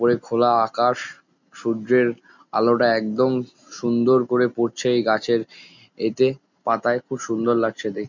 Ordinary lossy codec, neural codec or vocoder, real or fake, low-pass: none; none; real; 7.2 kHz